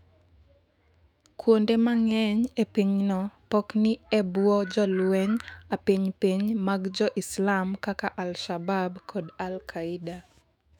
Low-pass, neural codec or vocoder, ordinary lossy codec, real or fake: 19.8 kHz; autoencoder, 48 kHz, 128 numbers a frame, DAC-VAE, trained on Japanese speech; none; fake